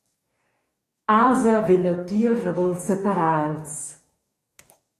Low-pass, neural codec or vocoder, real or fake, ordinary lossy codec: 14.4 kHz; codec, 44.1 kHz, 2.6 kbps, DAC; fake; AAC, 48 kbps